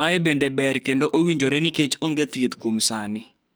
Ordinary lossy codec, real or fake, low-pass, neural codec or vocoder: none; fake; none; codec, 44.1 kHz, 2.6 kbps, SNAC